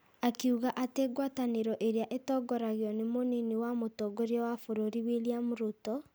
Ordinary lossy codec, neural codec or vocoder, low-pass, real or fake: none; none; none; real